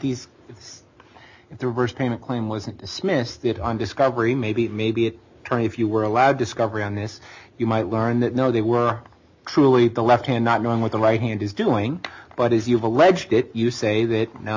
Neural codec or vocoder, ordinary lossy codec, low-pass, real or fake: none; MP3, 64 kbps; 7.2 kHz; real